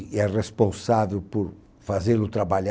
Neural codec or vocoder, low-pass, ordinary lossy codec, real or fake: none; none; none; real